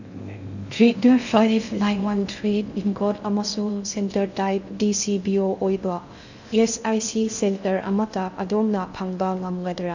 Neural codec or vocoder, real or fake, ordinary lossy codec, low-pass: codec, 16 kHz in and 24 kHz out, 0.6 kbps, FocalCodec, streaming, 2048 codes; fake; none; 7.2 kHz